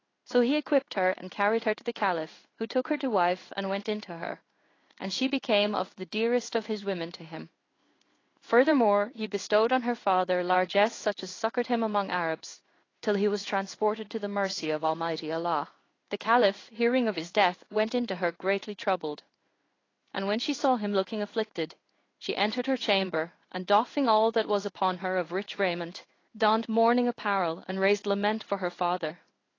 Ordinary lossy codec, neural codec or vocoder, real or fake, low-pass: AAC, 32 kbps; codec, 16 kHz in and 24 kHz out, 1 kbps, XY-Tokenizer; fake; 7.2 kHz